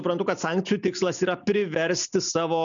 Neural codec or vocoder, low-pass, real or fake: none; 7.2 kHz; real